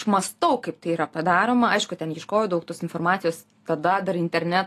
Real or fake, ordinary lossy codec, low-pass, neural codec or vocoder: real; AAC, 48 kbps; 14.4 kHz; none